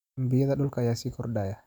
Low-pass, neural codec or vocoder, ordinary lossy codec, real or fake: 19.8 kHz; none; none; real